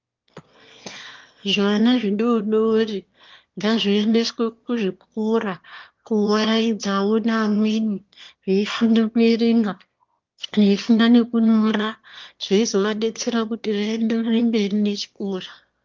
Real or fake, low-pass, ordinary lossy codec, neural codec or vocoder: fake; 7.2 kHz; Opus, 32 kbps; autoencoder, 22.05 kHz, a latent of 192 numbers a frame, VITS, trained on one speaker